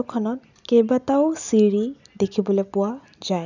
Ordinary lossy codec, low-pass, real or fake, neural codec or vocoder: none; 7.2 kHz; real; none